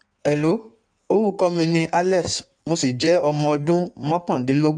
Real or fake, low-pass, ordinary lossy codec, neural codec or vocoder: fake; 9.9 kHz; none; codec, 16 kHz in and 24 kHz out, 1.1 kbps, FireRedTTS-2 codec